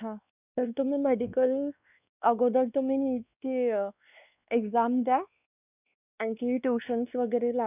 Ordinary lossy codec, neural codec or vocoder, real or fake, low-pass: none; codec, 16 kHz, 2 kbps, X-Codec, WavLM features, trained on Multilingual LibriSpeech; fake; 3.6 kHz